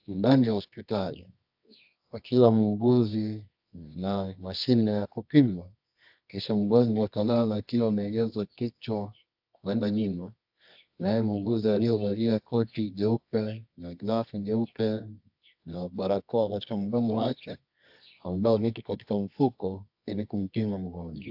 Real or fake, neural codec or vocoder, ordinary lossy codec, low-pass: fake; codec, 24 kHz, 0.9 kbps, WavTokenizer, medium music audio release; AAC, 48 kbps; 5.4 kHz